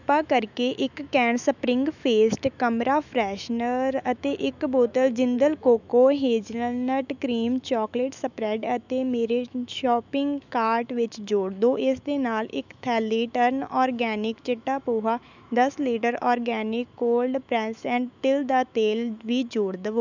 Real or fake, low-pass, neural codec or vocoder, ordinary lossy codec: fake; 7.2 kHz; autoencoder, 48 kHz, 128 numbers a frame, DAC-VAE, trained on Japanese speech; none